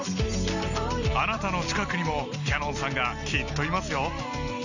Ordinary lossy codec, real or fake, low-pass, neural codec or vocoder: none; real; 7.2 kHz; none